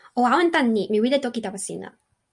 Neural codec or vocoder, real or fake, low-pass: none; real; 10.8 kHz